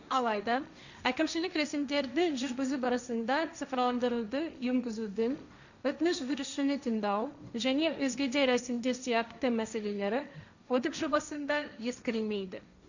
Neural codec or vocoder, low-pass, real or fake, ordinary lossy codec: codec, 16 kHz, 1.1 kbps, Voila-Tokenizer; 7.2 kHz; fake; none